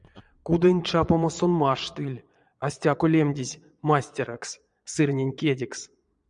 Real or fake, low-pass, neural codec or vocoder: fake; 9.9 kHz; vocoder, 22.05 kHz, 80 mel bands, Vocos